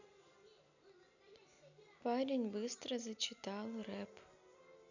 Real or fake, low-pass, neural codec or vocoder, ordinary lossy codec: real; 7.2 kHz; none; none